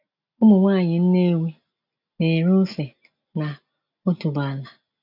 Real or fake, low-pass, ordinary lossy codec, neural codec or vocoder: real; 5.4 kHz; none; none